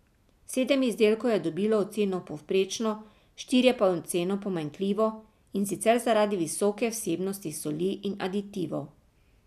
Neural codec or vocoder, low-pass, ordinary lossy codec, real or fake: none; 14.4 kHz; none; real